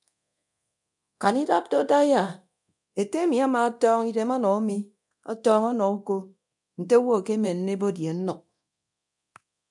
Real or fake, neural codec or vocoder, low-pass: fake; codec, 24 kHz, 0.9 kbps, DualCodec; 10.8 kHz